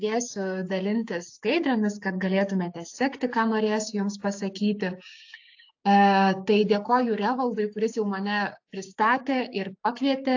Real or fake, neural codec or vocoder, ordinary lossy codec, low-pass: fake; codec, 16 kHz, 6 kbps, DAC; AAC, 48 kbps; 7.2 kHz